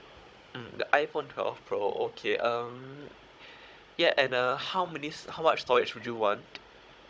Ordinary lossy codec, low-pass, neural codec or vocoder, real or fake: none; none; codec, 16 kHz, 16 kbps, FunCodec, trained on Chinese and English, 50 frames a second; fake